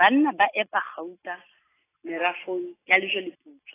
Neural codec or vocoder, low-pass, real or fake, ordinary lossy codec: none; 3.6 kHz; real; AAC, 16 kbps